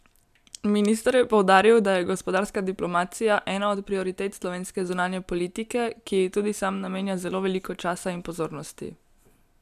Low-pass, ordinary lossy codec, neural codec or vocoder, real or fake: 14.4 kHz; none; none; real